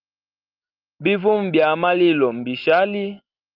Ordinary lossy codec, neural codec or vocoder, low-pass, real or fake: Opus, 32 kbps; none; 5.4 kHz; real